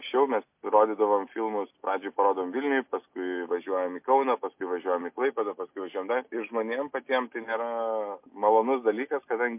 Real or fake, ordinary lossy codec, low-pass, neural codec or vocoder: real; MP3, 32 kbps; 3.6 kHz; none